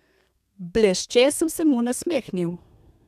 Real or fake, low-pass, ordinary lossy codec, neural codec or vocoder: fake; 14.4 kHz; none; codec, 32 kHz, 1.9 kbps, SNAC